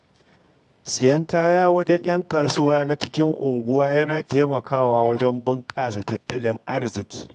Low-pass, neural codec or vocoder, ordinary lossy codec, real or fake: 10.8 kHz; codec, 24 kHz, 0.9 kbps, WavTokenizer, medium music audio release; AAC, 96 kbps; fake